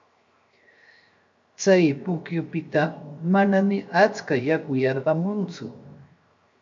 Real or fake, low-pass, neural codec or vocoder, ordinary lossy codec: fake; 7.2 kHz; codec, 16 kHz, 0.7 kbps, FocalCodec; MP3, 48 kbps